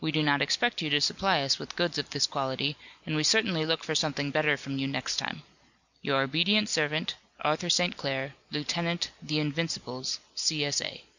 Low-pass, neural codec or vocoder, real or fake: 7.2 kHz; none; real